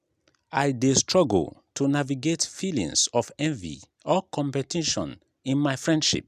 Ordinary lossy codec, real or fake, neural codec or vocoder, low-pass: none; real; none; 14.4 kHz